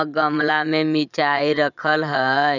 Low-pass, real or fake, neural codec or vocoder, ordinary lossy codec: 7.2 kHz; fake; vocoder, 22.05 kHz, 80 mel bands, Vocos; none